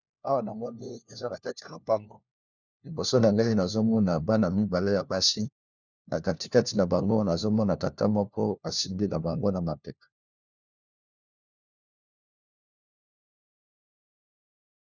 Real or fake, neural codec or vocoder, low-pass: fake; codec, 16 kHz, 1 kbps, FunCodec, trained on LibriTTS, 50 frames a second; 7.2 kHz